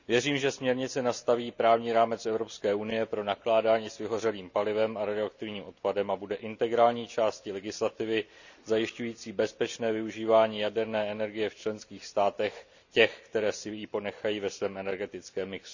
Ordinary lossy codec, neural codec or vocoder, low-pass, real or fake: none; none; 7.2 kHz; real